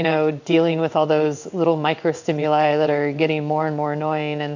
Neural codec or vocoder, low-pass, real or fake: codec, 16 kHz in and 24 kHz out, 1 kbps, XY-Tokenizer; 7.2 kHz; fake